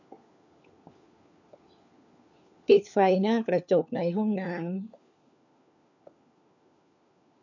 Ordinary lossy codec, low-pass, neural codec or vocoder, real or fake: none; 7.2 kHz; codec, 16 kHz, 4 kbps, FunCodec, trained on LibriTTS, 50 frames a second; fake